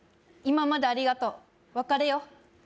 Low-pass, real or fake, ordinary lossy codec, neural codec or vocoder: none; real; none; none